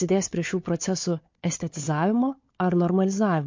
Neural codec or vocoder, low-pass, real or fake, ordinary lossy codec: codec, 16 kHz, 4 kbps, FunCodec, trained on Chinese and English, 50 frames a second; 7.2 kHz; fake; MP3, 48 kbps